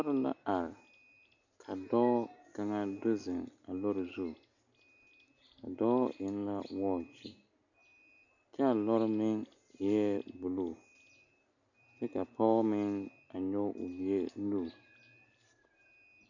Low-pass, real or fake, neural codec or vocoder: 7.2 kHz; real; none